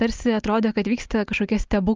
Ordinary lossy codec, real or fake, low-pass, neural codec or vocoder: Opus, 32 kbps; real; 7.2 kHz; none